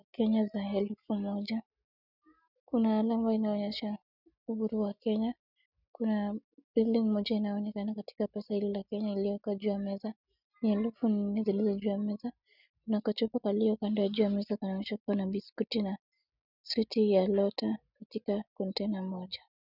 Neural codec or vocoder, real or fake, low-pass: none; real; 5.4 kHz